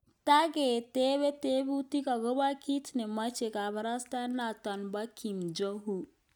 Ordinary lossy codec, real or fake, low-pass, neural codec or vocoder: none; real; none; none